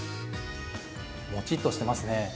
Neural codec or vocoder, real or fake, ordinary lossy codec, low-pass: none; real; none; none